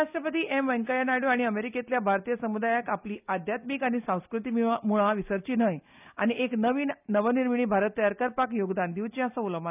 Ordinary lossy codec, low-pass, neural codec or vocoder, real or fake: none; 3.6 kHz; none; real